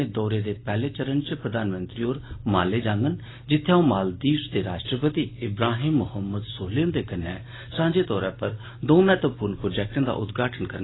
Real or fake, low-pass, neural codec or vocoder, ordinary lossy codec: real; 7.2 kHz; none; AAC, 16 kbps